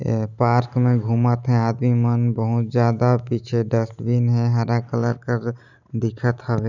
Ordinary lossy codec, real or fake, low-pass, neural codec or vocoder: none; real; 7.2 kHz; none